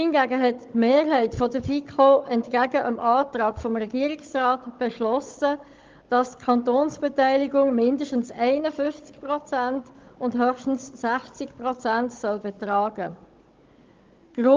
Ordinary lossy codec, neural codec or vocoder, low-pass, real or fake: Opus, 32 kbps; codec, 16 kHz, 16 kbps, FunCodec, trained on Chinese and English, 50 frames a second; 7.2 kHz; fake